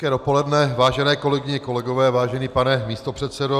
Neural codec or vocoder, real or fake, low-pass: none; real; 14.4 kHz